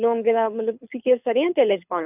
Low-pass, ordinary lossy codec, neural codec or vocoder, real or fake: 3.6 kHz; none; codec, 24 kHz, 6 kbps, HILCodec; fake